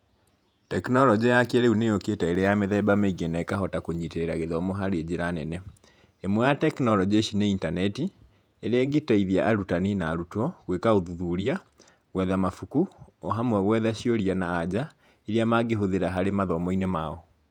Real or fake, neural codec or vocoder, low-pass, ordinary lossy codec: fake; vocoder, 48 kHz, 128 mel bands, Vocos; 19.8 kHz; none